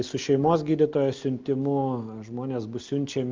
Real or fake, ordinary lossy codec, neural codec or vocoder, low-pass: real; Opus, 24 kbps; none; 7.2 kHz